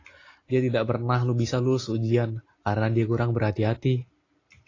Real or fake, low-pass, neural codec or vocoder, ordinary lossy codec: real; 7.2 kHz; none; AAC, 32 kbps